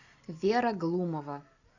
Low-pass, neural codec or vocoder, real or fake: 7.2 kHz; none; real